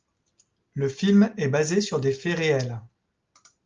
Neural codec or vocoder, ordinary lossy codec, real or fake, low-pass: none; Opus, 32 kbps; real; 7.2 kHz